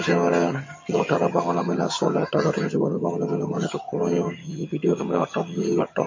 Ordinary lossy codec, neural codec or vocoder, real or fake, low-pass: MP3, 32 kbps; vocoder, 22.05 kHz, 80 mel bands, HiFi-GAN; fake; 7.2 kHz